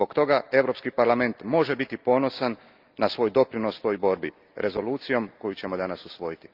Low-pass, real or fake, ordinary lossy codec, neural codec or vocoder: 5.4 kHz; real; Opus, 24 kbps; none